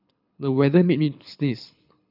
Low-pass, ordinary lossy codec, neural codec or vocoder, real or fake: 5.4 kHz; none; codec, 24 kHz, 6 kbps, HILCodec; fake